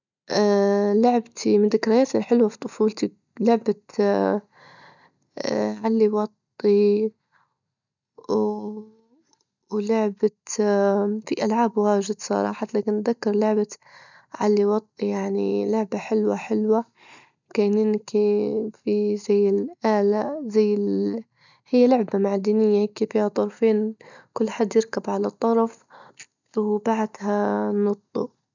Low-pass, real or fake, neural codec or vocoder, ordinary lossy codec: 7.2 kHz; real; none; none